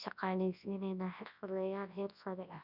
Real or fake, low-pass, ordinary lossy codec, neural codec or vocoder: fake; 5.4 kHz; none; codec, 24 kHz, 0.9 kbps, WavTokenizer, large speech release